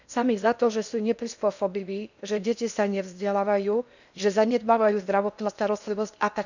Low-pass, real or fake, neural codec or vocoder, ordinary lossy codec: 7.2 kHz; fake; codec, 16 kHz in and 24 kHz out, 0.8 kbps, FocalCodec, streaming, 65536 codes; none